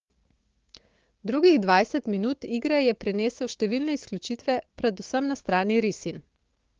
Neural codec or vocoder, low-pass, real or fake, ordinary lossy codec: codec, 16 kHz, 6 kbps, DAC; 7.2 kHz; fake; Opus, 16 kbps